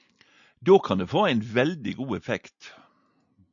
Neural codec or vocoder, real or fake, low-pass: none; real; 7.2 kHz